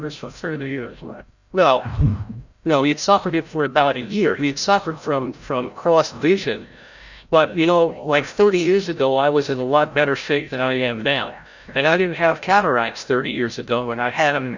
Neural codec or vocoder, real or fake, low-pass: codec, 16 kHz, 0.5 kbps, FreqCodec, larger model; fake; 7.2 kHz